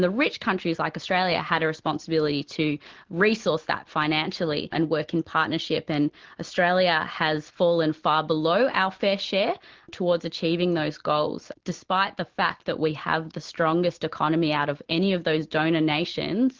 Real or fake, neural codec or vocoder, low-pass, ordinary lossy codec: real; none; 7.2 kHz; Opus, 16 kbps